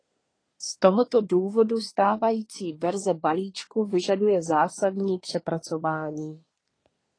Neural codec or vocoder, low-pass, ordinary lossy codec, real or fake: codec, 24 kHz, 1 kbps, SNAC; 9.9 kHz; AAC, 32 kbps; fake